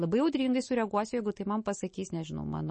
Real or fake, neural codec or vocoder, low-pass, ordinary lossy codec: real; none; 10.8 kHz; MP3, 32 kbps